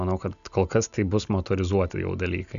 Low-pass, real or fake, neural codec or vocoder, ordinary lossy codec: 7.2 kHz; real; none; MP3, 96 kbps